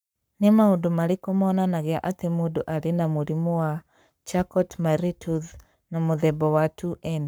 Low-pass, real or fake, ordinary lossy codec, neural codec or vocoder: none; fake; none; codec, 44.1 kHz, 7.8 kbps, Pupu-Codec